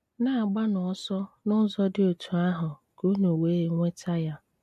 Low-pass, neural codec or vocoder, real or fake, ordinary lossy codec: 14.4 kHz; none; real; MP3, 64 kbps